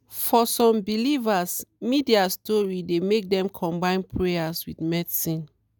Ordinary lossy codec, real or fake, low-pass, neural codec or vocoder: none; real; none; none